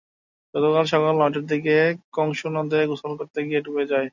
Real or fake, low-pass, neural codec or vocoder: real; 7.2 kHz; none